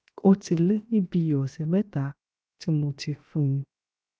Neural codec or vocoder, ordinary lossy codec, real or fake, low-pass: codec, 16 kHz, 0.7 kbps, FocalCodec; none; fake; none